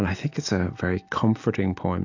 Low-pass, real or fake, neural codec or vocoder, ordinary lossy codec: 7.2 kHz; real; none; AAC, 48 kbps